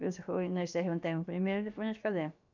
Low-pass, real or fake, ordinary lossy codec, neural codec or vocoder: 7.2 kHz; fake; none; codec, 16 kHz, about 1 kbps, DyCAST, with the encoder's durations